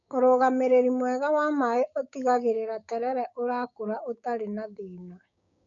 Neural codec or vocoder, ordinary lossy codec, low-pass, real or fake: codec, 16 kHz, 6 kbps, DAC; none; 7.2 kHz; fake